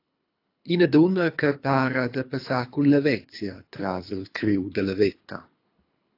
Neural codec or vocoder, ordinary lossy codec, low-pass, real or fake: codec, 24 kHz, 3 kbps, HILCodec; AAC, 32 kbps; 5.4 kHz; fake